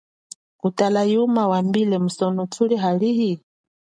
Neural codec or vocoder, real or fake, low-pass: none; real; 9.9 kHz